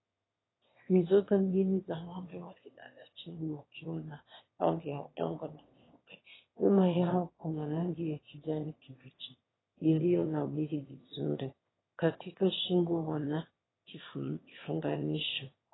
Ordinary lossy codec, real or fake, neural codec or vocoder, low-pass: AAC, 16 kbps; fake; autoencoder, 22.05 kHz, a latent of 192 numbers a frame, VITS, trained on one speaker; 7.2 kHz